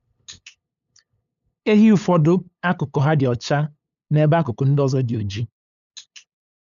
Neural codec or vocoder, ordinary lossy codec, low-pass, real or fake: codec, 16 kHz, 8 kbps, FunCodec, trained on LibriTTS, 25 frames a second; none; 7.2 kHz; fake